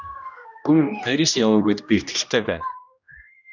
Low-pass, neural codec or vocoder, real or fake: 7.2 kHz; codec, 16 kHz, 1 kbps, X-Codec, HuBERT features, trained on general audio; fake